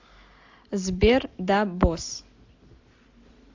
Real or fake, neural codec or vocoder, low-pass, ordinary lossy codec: real; none; 7.2 kHz; AAC, 48 kbps